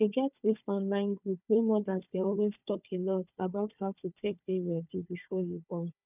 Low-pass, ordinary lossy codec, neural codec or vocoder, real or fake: 3.6 kHz; none; codec, 16 kHz, 4 kbps, FunCodec, trained on Chinese and English, 50 frames a second; fake